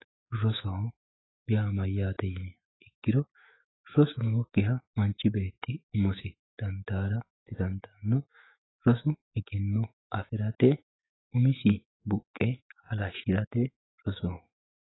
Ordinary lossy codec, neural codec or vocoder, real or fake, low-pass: AAC, 16 kbps; autoencoder, 48 kHz, 128 numbers a frame, DAC-VAE, trained on Japanese speech; fake; 7.2 kHz